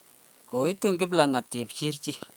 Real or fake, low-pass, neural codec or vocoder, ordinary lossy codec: fake; none; codec, 44.1 kHz, 2.6 kbps, SNAC; none